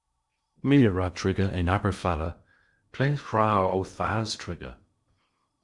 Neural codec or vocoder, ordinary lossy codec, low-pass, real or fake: codec, 16 kHz in and 24 kHz out, 0.8 kbps, FocalCodec, streaming, 65536 codes; Opus, 64 kbps; 10.8 kHz; fake